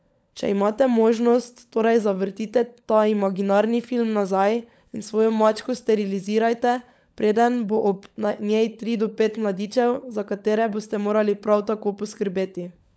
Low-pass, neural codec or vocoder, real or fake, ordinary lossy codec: none; codec, 16 kHz, 16 kbps, FunCodec, trained on LibriTTS, 50 frames a second; fake; none